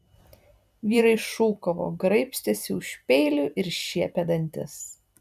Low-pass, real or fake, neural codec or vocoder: 14.4 kHz; fake; vocoder, 44.1 kHz, 128 mel bands every 256 samples, BigVGAN v2